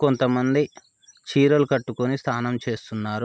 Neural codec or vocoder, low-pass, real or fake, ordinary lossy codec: none; none; real; none